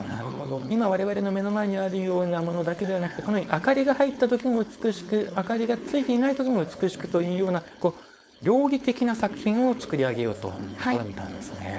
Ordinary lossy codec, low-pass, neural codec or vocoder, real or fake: none; none; codec, 16 kHz, 4.8 kbps, FACodec; fake